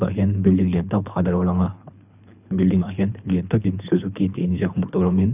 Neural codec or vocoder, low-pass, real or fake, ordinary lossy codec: codec, 24 kHz, 3 kbps, HILCodec; 3.6 kHz; fake; none